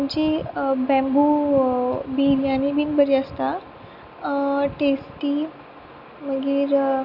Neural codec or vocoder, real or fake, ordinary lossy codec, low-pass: none; real; none; 5.4 kHz